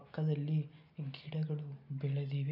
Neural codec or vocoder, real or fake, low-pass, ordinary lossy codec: none; real; 5.4 kHz; none